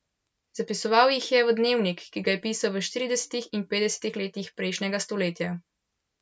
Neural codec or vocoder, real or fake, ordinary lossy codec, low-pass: none; real; none; none